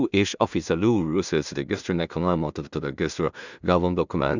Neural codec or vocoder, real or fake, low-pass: codec, 16 kHz in and 24 kHz out, 0.4 kbps, LongCat-Audio-Codec, two codebook decoder; fake; 7.2 kHz